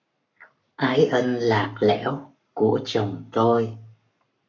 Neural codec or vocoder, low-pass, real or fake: codec, 44.1 kHz, 7.8 kbps, Pupu-Codec; 7.2 kHz; fake